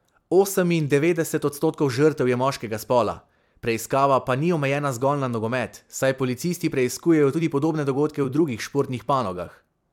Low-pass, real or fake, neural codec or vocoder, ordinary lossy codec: 19.8 kHz; fake; vocoder, 44.1 kHz, 128 mel bands every 256 samples, BigVGAN v2; MP3, 96 kbps